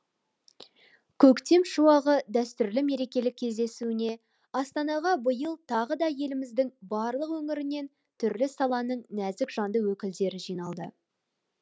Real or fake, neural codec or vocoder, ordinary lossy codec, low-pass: real; none; none; none